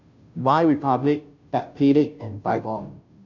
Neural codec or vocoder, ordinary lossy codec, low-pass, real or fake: codec, 16 kHz, 0.5 kbps, FunCodec, trained on Chinese and English, 25 frames a second; none; 7.2 kHz; fake